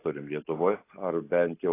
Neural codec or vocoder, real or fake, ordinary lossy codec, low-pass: none; real; AAC, 24 kbps; 3.6 kHz